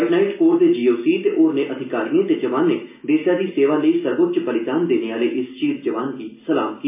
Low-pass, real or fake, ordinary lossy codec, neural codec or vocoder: 3.6 kHz; real; MP3, 24 kbps; none